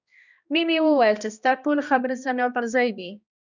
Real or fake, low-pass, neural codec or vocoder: fake; 7.2 kHz; codec, 16 kHz, 1 kbps, X-Codec, HuBERT features, trained on balanced general audio